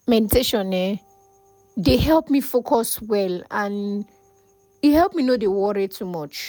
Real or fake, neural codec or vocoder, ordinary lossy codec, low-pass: real; none; none; none